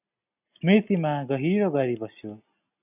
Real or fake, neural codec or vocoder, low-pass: real; none; 3.6 kHz